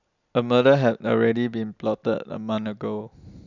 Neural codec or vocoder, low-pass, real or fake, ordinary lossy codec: none; 7.2 kHz; real; none